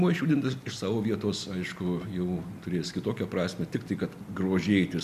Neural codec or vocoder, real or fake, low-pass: none; real; 14.4 kHz